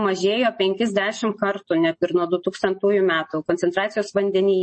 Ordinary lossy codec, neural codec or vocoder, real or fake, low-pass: MP3, 32 kbps; none; real; 10.8 kHz